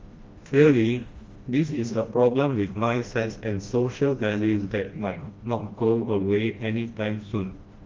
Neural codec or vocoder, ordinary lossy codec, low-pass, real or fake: codec, 16 kHz, 1 kbps, FreqCodec, smaller model; Opus, 32 kbps; 7.2 kHz; fake